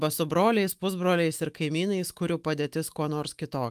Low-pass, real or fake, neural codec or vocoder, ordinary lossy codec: 14.4 kHz; fake; autoencoder, 48 kHz, 128 numbers a frame, DAC-VAE, trained on Japanese speech; Opus, 32 kbps